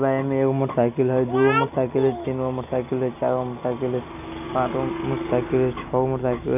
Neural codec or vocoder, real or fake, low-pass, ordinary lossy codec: none; real; 3.6 kHz; none